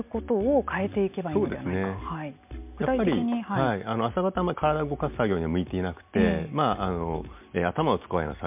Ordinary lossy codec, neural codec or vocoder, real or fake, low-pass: MP3, 32 kbps; none; real; 3.6 kHz